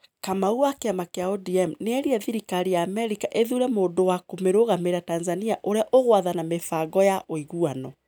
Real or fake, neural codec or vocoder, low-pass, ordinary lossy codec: real; none; none; none